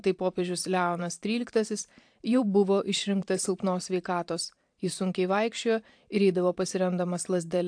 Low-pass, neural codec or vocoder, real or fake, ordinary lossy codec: 9.9 kHz; vocoder, 44.1 kHz, 128 mel bands every 512 samples, BigVGAN v2; fake; AAC, 64 kbps